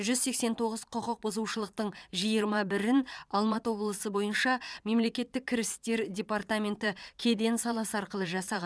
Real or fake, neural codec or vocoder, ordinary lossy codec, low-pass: fake; vocoder, 22.05 kHz, 80 mel bands, Vocos; none; none